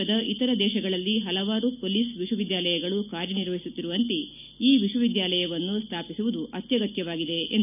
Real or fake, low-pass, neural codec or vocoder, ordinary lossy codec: real; 3.6 kHz; none; none